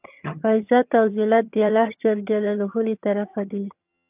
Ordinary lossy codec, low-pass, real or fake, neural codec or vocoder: AAC, 32 kbps; 3.6 kHz; fake; vocoder, 22.05 kHz, 80 mel bands, HiFi-GAN